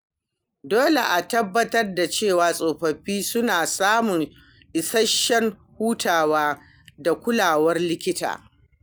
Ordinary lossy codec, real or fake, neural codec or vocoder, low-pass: none; real; none; none